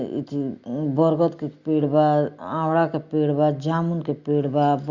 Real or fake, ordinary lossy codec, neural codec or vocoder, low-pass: real; Opus, 64 kbps; none; 7.2 kHz